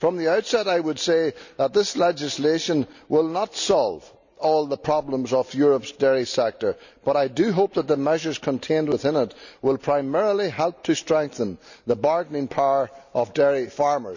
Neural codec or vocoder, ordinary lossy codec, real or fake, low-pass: none; none; real; 7.2 kHz